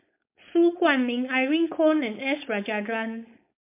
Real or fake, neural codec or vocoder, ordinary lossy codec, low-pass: fake; codec, 16 kHz, 4.8 kbps, FACodec; MP3, 32 kbps; 3.6 kHz